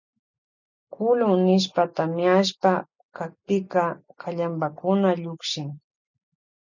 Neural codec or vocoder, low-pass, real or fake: none; 7.2 kHz; real